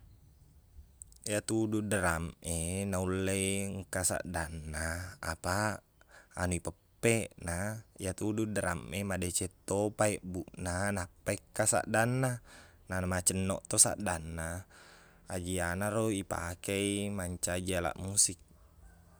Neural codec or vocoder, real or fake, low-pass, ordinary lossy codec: none; real; none; none